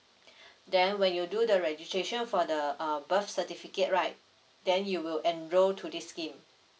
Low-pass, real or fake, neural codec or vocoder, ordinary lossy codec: none; real; none; none